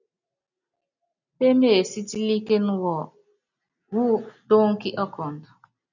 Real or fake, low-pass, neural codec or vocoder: real; 7.2 kHz; none